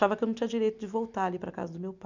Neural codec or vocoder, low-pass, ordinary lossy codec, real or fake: none; 7.2 kHz; none; real